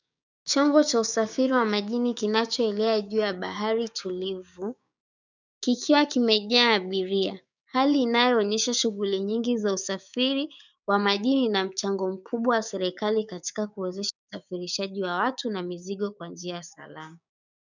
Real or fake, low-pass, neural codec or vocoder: fake; 7.2 kHz; codec, 16 kHz, 6 kbps, DAC